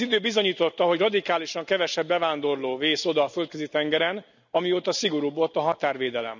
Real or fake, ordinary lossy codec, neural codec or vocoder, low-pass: real; none; none; 7.2 kHz